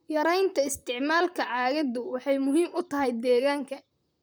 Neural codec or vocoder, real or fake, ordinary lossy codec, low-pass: vocoder, 44.1 kHz, 128 mel bands, Pupu-Vocoder; fake; none; none